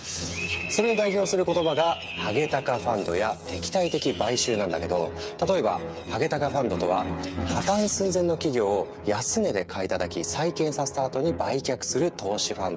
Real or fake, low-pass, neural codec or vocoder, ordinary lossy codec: fake; none; codec, 16 kHz, 8 kbps, FreqCodec, smaller model; none